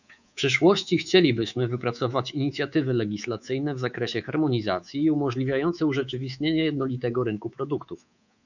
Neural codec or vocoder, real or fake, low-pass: codec, 24 kHz, 3.1 kbps, DualCodec; fake; 7.2 kHz